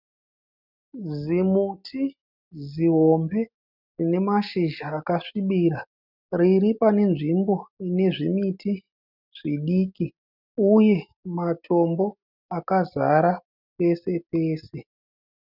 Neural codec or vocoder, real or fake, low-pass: none; real; 5.4 kHz